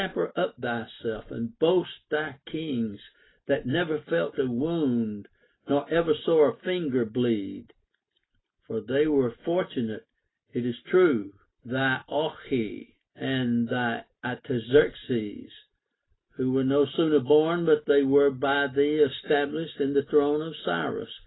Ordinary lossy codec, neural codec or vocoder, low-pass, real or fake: AAC, 16 kbps; none; 7.2 kHz; real